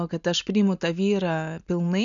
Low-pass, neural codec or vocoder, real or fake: 7.2 kHz; none; real